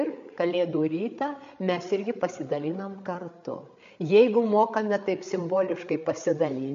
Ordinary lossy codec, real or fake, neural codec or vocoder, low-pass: MP3, 48 kbps; fake; codec, 16 kHz, 8 kbps, FreqCodec, larger model; 7.2 kHz